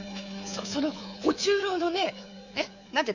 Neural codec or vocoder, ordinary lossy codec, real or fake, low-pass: codec, 24 kHz, 3.1 kbps, DualCodec; none; fake; 7.2 kHz